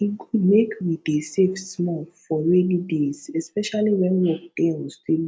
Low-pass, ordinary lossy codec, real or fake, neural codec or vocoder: none; none; real; none